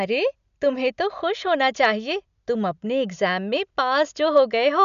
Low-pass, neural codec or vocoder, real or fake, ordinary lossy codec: 7.2 kHz; none; real; none